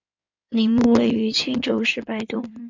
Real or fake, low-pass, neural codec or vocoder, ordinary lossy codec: fake; 7.2 kHz; codec, 16 kHz in and 24 kHz out, 2.2 kbps, FireRedTTS-2 codec; MP3, 64 kbps